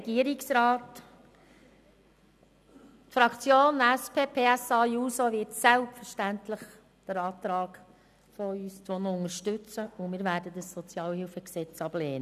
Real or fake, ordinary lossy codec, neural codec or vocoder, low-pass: real; none; none; 14.4 kHz